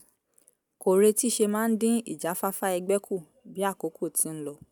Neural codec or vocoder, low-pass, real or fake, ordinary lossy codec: none; none; real; none